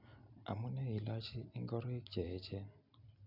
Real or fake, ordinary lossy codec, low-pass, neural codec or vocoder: real; none; 5.4 kHz; none